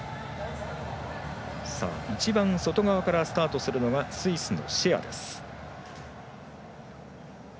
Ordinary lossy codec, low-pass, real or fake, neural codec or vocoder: none; none; real; none